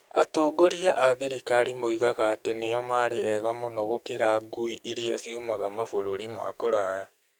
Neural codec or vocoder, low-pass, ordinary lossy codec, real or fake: codec, 44.1 kHz, 2.6 kbps, SNAC; none; none; fake